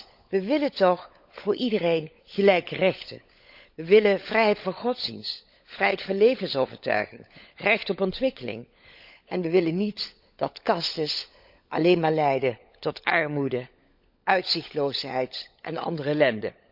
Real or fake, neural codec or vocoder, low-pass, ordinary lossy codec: fake; codec, 16 kHz, 16 kbps, FunCodec, trained on LibriTTS, 50 frames a second; 5.4 kHz; none